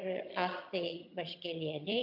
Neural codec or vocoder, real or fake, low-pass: vocoder, 22.05 kHz, 80 mel bands, HiFi-GAN; fake; 5.4 kHz